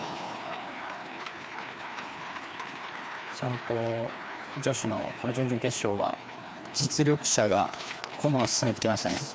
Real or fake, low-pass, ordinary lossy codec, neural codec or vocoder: fake; none; none; codec, 16 kHz, 2 kbps, FreqCodec, larger model